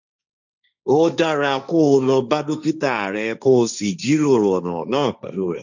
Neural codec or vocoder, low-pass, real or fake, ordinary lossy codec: codec, 16 kHz, 1.1 kbps, Voila-Tokenizer; 7.2 kHz; fake; none